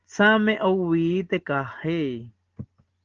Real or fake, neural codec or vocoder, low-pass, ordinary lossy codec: real; none; 7.2 kHz; Opus, 16 kbps